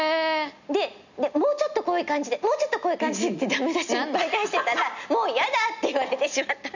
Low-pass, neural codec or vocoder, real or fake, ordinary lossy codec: 7.2 kHz; none; real; none